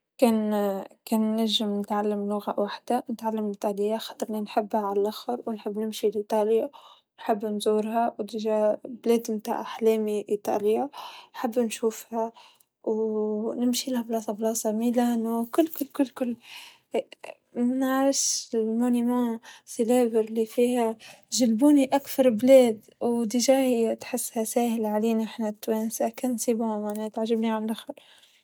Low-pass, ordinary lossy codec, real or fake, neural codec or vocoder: none; none; real; none